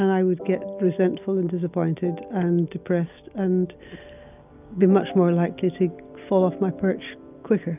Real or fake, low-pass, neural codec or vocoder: real; 3.6 kHz; none